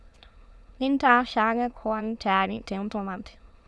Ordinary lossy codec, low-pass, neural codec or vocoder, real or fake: none; none; autoencoder, 22.05 kHz, a latent of 192 numbers a frame, VITS, trained on many speakers; fake